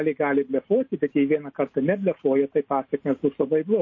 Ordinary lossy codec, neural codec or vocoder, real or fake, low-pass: MP3, 32 kbps; none; real; 7.2 kHz